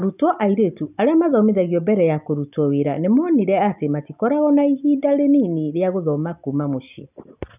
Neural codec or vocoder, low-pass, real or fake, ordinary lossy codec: none; 3.6 kHz; real; none